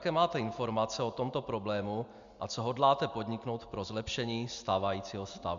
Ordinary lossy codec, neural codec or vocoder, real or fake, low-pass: MP3, 64 kbps; none; real; 7.2 kHz